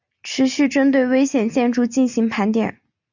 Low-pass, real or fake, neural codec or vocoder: 7.2 kHz; real; none